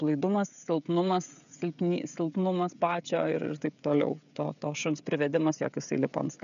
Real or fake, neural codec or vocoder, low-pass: fake; codec, 16 kHz, 16 kbps, FreqCodec, smaller model; 7.2 kHz